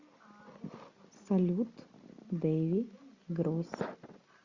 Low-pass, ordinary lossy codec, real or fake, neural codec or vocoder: 7.2 kHz; Opus, 64 kbps; real; none